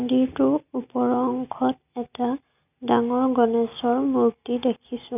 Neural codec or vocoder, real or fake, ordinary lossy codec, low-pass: none; real; none; 3.6 kHz